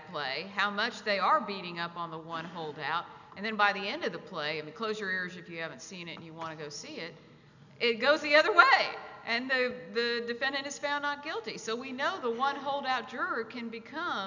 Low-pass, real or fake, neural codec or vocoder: 7.2 kHz; real; none